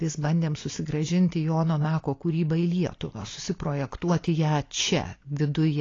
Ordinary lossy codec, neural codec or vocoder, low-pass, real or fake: AAC, 32 kbps; none; 7.2 kHz; real